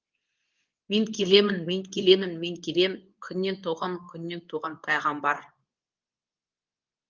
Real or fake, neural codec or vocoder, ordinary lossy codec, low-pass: fake; codec, 24 kHz, 0.9 kbps, WavTokenizer, medium speech release version 2; Opus, 24 kbps; 7.2 kHz